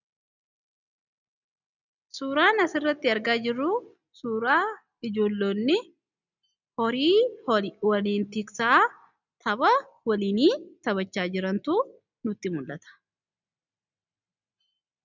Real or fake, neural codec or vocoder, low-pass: real; none; 7.2 kHz